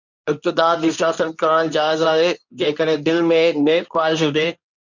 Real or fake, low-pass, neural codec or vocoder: fake; 7.2 kHz; codec, 24 kHz, 0.9 kbps, WavTokenizer, medium speech release version 2